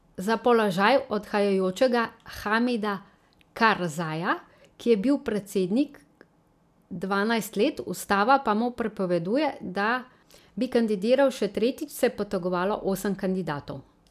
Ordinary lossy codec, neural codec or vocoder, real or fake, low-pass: none; none; real; 14.4 kHz